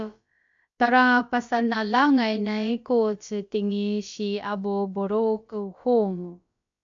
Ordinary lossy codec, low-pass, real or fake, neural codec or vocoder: AAC, 64 kbps; 7.2 kHz; fake; codec, 16 kHz, about 1 kbps, DyCAST, with the encoder's durations